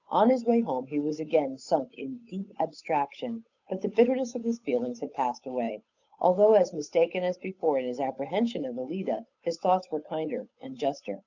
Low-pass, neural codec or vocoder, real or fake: 7.2 kHz; codec, 16 kHz, 8 kbps, FunCodec, trained on Chinese and English, 25 frames a second; fake